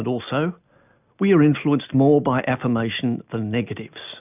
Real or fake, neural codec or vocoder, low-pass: real; none; 3.6 kHz